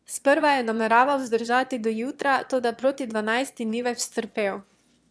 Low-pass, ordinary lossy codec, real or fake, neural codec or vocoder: none; none; fake; autoencoder, 22.05 kHz, a latent of 192 numbers a frame, VITS, trained on one speaker